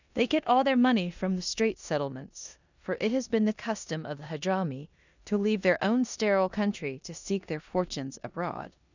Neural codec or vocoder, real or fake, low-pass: codec, 16 kHz in and 24 kHz out, 0.9 kbps, LongCat-Audio-Codec, four codebook decoder; fake; 7.2 kHz